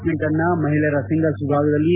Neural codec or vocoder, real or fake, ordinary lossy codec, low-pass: none; real; Opus, 24 kbps; 3.6 kHz